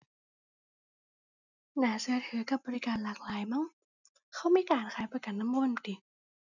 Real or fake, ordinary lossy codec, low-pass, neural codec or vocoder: real; none; 7.2 kHz; none